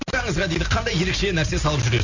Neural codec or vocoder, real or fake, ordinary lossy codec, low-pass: none; real; none; 7.2 kHz